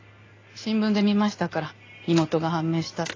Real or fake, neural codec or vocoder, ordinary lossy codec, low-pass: real; none; AAC, 32 kbps; 7.2 kHz